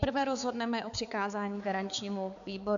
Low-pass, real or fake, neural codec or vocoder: 7.2 kHz; fake; codec, 16 kHz, 4 kbps, X-Codec, HuBERT features, trained on balanced general audio